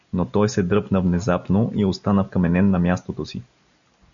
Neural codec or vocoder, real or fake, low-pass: none; real; 7.2 kHz